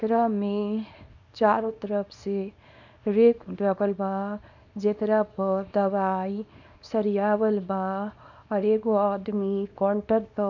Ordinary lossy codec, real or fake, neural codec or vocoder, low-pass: none; fake; codec, 24 kHz, 0.9 kbps, WavTokenizer, small release; 7.2 kHz